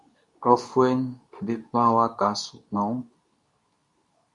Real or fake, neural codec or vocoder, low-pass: fake; codec, 24 kHz, 0.9 kbps, WavTokenizer, medium speech release version 2; 10.8 kHz